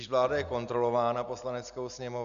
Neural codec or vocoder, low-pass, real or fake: none; 7.2 kHz; real